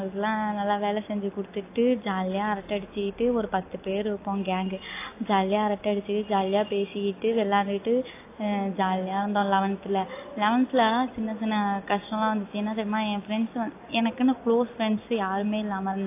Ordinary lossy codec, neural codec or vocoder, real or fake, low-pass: AAC, 24 kbps; none; real; 3.6 kHz